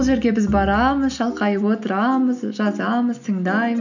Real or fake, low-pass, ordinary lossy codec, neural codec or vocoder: real; 7.2 kHz; none; none